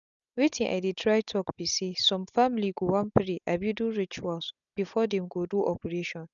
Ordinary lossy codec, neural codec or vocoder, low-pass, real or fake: none; none; 7.2 kHz; real